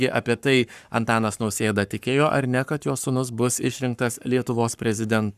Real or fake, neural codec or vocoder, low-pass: fake; codec, 44.1 kHz, 7.8 kbps, Pupu-Codec; 14.4 kHz